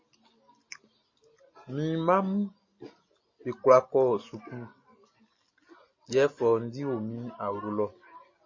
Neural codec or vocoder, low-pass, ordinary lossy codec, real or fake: none; 7.2 kHz; MP3, 32 kbps; real